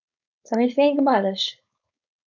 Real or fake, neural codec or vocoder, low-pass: fake; codec, 16 kHz, 4.8 kbps, FACodec; 7.2 kHz